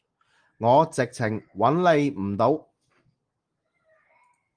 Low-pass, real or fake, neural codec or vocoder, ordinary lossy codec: 9.9 kHz; real; none; Opus, 24 kbps